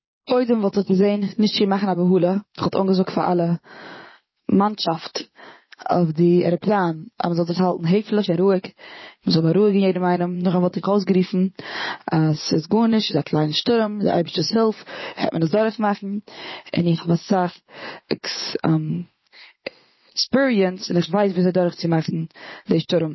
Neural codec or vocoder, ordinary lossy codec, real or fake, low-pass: vocoder, 44.1 kHz, 80 mel bands, Vocos; MP3, 24 kbps; fake; 7.2 kHz